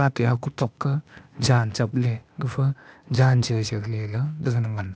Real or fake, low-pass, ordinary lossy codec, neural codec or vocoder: fake; none; none; codec, 16 kHz, 0.8 kbps, ZipCodec